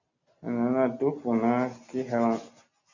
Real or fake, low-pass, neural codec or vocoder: real; 7.2 kHz; none